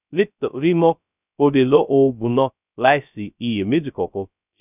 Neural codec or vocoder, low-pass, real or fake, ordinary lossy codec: codec, 16 kHz, 0.2 kbps, FocalCodec; 3.6 kHz; fake; none